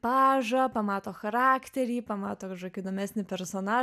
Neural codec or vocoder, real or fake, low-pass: none; real; 14.4 kHz